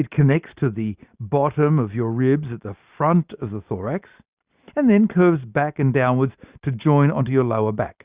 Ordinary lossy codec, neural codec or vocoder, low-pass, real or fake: Opus, 64 kbps; none; 3.6 kHz; real